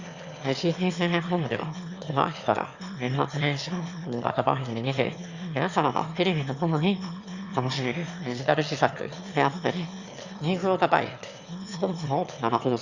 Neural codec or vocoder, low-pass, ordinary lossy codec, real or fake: autoencoder, 22.05 kHz, a latent of 192 numbers a frame, VITS, trained on one speaker; 7.2 kHz; Opus, 64 kbps; fake